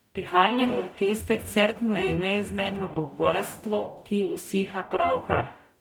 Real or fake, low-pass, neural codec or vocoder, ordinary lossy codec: fake; none; codec, 44.1 kHz, 0.9 kbps, DAC; none